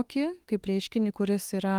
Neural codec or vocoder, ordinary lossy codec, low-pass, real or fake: autoencoder, 48 kHz, 32 numbers a frame, DAC-VAE, trained on Japanese speech; Opus, 24 kbps; 19.8 kHz; fake